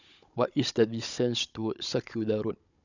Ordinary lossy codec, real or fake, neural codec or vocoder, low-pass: none; fake; codec, 16 kHz, 16 kbps, FunCodec, trained on Chinese and English, 50 frames a second; 7.2 kHz